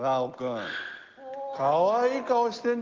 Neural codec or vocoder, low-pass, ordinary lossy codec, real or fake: none; 7.2 kHz; Opus, 16 kbps; real